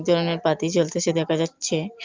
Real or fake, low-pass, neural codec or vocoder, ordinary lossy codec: real; 7.2 kHz; none; Opus, 24 kbps